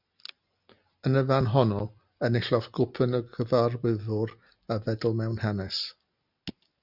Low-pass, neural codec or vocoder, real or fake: 5.4 kHz; none; real